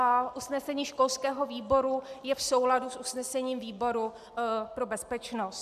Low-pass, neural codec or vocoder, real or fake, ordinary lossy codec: 14.4 kHz; none; real; AAC, 96 kbps